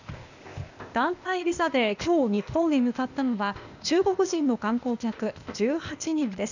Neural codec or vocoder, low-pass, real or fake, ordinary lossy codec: codec, 16 kHz, 0.8 kbps, ZipCodec; 7.2 kHz; fake; none